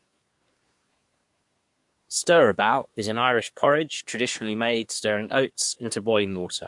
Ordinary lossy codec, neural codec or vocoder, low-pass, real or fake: MP3, 64 kbps; codec, 24 kHz, 1 kbps, SNAC; 10.8 kHz; fake